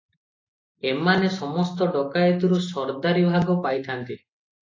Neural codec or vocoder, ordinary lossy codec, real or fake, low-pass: none; AAC, 32 kbps; real; 7.2 kHz